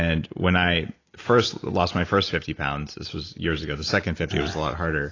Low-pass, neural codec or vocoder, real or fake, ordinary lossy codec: 7.2 kHz; none; real; AAC, 32 kbps